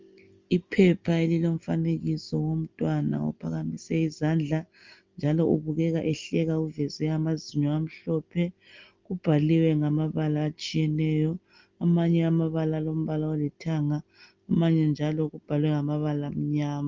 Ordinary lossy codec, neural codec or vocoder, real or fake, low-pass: Opus, 32 kbps; none; real; 7.2 kHz